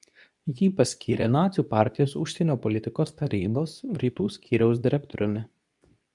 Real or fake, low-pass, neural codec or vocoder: fake; 10.8 kHz; codec, 24 kHz, 0.9 kbps, WavTokenizer, medium speech release version 2